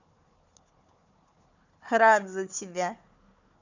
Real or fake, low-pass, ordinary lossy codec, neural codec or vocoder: fake; 7.2 kHz; none; codec, 16 kHz, 4 kbps, FunCodec, trained on Chinese and English, 50 frames a second